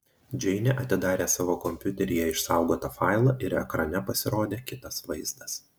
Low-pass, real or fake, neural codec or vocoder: 19.8 kHz; real; none